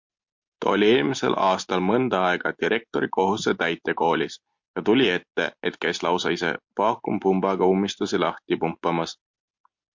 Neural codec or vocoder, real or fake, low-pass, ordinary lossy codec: none; real; 7.2 kHz; MP3, 48 kbps